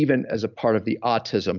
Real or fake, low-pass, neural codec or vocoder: real; 7.2 kHz; none